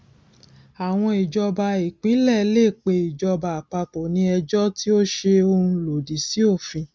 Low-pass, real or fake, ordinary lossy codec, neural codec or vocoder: none; real; none; none